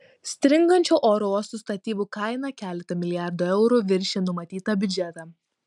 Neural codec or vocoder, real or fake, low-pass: none; real; 10.8 kHz